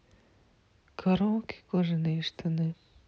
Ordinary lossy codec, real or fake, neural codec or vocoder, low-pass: none; real; none; none